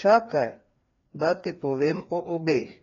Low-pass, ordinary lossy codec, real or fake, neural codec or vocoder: 7.2 kHz; AAC, 32 kbps; fake; codec, 16 kHz, 2 kbps, FreqCodec, larger model